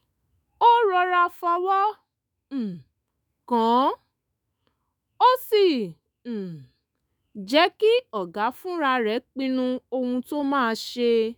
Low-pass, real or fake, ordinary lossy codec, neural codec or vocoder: none; fake; none; autoencoder, 48 kHz, 128 numbers a frame, DAC-VAE, trained on Japanese speech